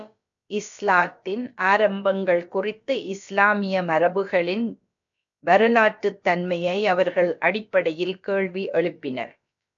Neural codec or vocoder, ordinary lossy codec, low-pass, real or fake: codec, 16 kHz, about 1 kbps, DyCAST, with the encoder's durations; MP3, 64 kbps; 7.2 kHz; fake